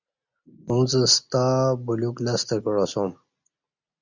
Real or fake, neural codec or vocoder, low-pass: real; none; 7.2 kHz